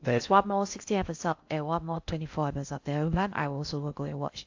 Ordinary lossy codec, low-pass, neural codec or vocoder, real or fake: AAC, 48 kbps; 7.2 kHz; codec, 16 kHz in and 24 kHz out, 0.6 kbps, FocalCodec, streaming, 2048 codes; fake